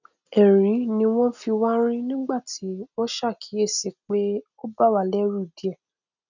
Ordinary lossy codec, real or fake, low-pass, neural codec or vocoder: none; real; 7.2 kHz; none